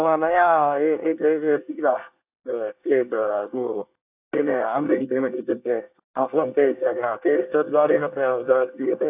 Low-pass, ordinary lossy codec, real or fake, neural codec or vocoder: 3.6 kHz; AAC, 32 kbps; fake; codec, 24 kHz, 1 kbps, SNAC